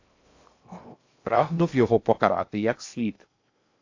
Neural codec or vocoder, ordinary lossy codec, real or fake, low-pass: codec, 16 kHz in and 24 kHz out, 0.6 kbps, FocalCodec, streaming, 2048 codes; AAC, 48 kbps; fake; 7.2 kHz